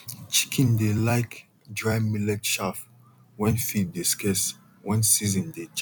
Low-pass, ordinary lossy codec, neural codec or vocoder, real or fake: 19.8 kHz; none; vocoder, 44.1 kHz, 128 mel bands every 256 samples, BigVGAN v2; fake